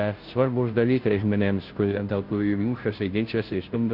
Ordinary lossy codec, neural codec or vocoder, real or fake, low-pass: Opus, 24 kbps; codec, 16 kHz, 0.5 kbps, FunCodec, trained on Chinese and English, 25 frames a second; fake; 5.4 kHz